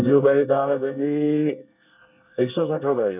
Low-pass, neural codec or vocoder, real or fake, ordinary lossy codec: 3.6 kHz; codec, 24 kHz, 1 kbps, SNAC; fake; none